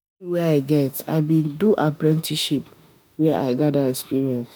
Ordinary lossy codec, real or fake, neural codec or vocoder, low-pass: none; fake; autoencoder, 48 kHz, 32 numbers a frame, DAC-VAE, trained on Japanese speech; none